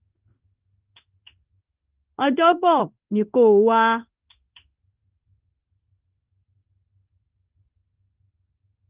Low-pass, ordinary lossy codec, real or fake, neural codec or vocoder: 3.6 kHz; Opus, 24 kbps; fake; codec, 16 kHz in and 24 kHz out, 1 kbps, XY-Tokenizer